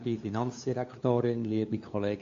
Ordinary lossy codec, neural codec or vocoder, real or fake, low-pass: MP3, 48 kbps; codec, 16 kHz, 2 kbps, FunCodec, trained on LibriTTS, 25 frames a second; fake; 7.2 kHz